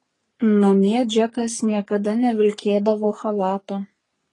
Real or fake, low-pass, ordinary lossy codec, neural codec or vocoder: fake; 10.8 kHz; AAC, 32 kbps; codec, 44.1 kHz, 3.4 kbps, Pupu-Codec